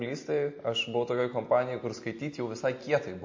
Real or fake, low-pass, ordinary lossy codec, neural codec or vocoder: real; 7.2 kHz; MP3, 32 kbps; none